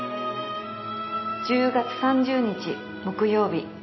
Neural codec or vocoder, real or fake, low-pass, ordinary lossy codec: none; real; 7.2 kHz; MP3, 24 kbps